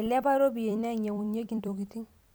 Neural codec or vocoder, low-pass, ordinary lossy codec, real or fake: vocoder, 44.1 kHz, 128 mel bands every 256 samples, BigVGAN v2; none; none; fake